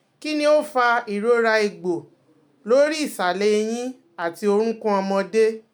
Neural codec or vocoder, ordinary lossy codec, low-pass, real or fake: none; none; none; real